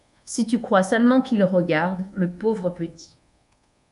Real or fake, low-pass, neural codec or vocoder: fake; 10.8 kHz; codec, 24 kHz, 1.2 kbps, DualCodec